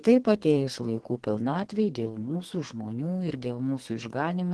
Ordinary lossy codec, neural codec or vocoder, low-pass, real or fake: Opus, 16 kbps; codec, 32 kHz, 1.9 kbps, SNAC; 10.8 kHz; fake